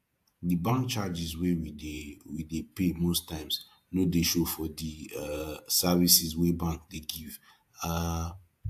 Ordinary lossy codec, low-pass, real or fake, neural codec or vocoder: AAC, 96 kbps; 14.4 kHz; fake; vocoder, 48 kHz, 128 mel bands, Vocos